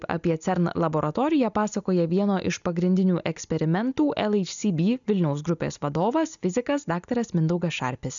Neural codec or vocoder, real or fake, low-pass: none; real; 7.2 kHz